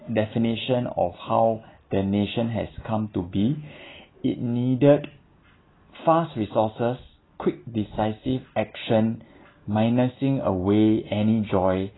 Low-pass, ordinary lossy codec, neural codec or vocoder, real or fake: 7.2 kHz; AAC, 16 kbps; none; real